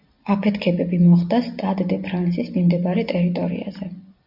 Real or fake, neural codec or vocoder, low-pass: real; none; 5.4 kHz